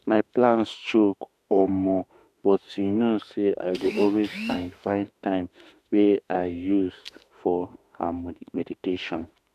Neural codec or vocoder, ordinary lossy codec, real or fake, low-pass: autoencoder, 48 kHz, 32 numbers a frame, DAC-VAE, trained on Japanese speech; none; fake; 14.4 kHz